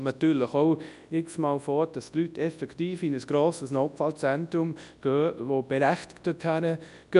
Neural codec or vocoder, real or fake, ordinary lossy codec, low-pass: codec, 24 kHz, 0.9 kbps, WavTokenizer, large speech release; fake; none; 10.8 kHz